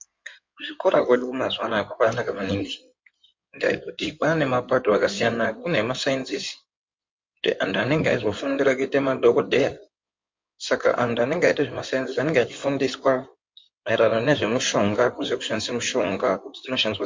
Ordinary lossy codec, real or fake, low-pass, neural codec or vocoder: MP3, 48 kbps; fake; 7.2 kHz; codec, 16 kHz in and 24 kHz out, 2.2 kbps, FireRedTTS-2 codec